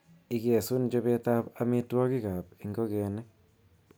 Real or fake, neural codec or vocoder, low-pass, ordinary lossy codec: real; none; none; none